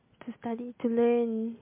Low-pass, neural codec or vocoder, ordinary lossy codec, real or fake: 3.6 kHz; none; MP3, 24 kbps; real